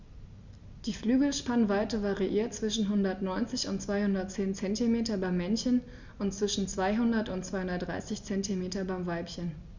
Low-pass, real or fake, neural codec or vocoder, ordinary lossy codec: 7.2 kHz; real; none; Opus, 64 kbps